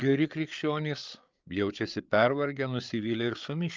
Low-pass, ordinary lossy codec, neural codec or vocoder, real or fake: 7.2 kHz; Opus, 32 kbps; codec, 16 kHz, 8 kbps, FreqCodec, larger model; fake